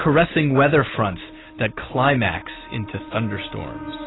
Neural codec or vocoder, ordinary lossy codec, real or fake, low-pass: none; AAC, 16 kbps; real; 7.2 kHz